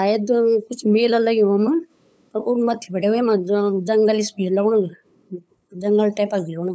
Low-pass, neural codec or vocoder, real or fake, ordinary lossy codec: none; codec, 16 kHz, 8 kbps, FunCodec, trained on LibriTTS, 25 frames a second; fake; none